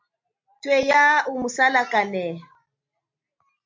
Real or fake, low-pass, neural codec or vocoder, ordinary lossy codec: real; 7.2 kHz; none; MP3, 48 kbps